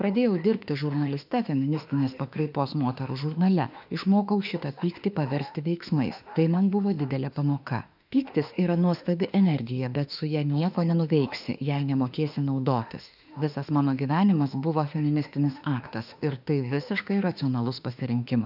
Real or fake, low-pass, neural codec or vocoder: fake; 5.4 kHz; autoencoder, 48 kHz, 32 numbers a frame, DAC-VAE, trained on Japanese speech